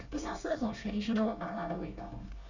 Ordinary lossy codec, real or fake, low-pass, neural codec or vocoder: none; fake; 7.2 kHz; codec, 24 kHz, 1 kbps, SNAC